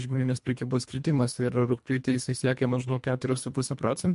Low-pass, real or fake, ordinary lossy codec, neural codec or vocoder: 10.8 kHz; fake; MP3, 64 kbps; codec, 24 kHz, 1.5 kbps, HILCodec